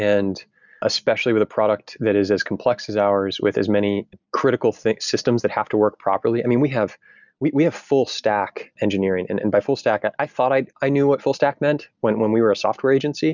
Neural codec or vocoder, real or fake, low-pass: none; real; 7.2 kHz